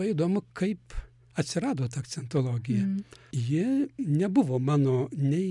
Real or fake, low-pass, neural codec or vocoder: real; 10.8 kHz; none